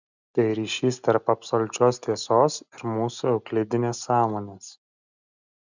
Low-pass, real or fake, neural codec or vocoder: 7.2 kHz; real; none